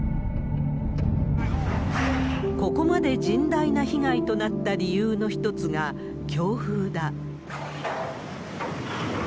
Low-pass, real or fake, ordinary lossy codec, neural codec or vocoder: none; real; none; none